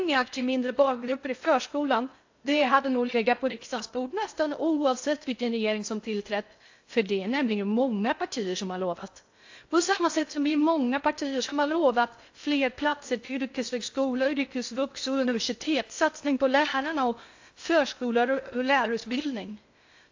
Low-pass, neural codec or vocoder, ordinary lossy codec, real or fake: 7.2 kHz; codec, 16 kHz in and 24 kHz out, 0.6 kbps, FocalCodec, streaming, 2048 codes; AAC, 48 kbps; fake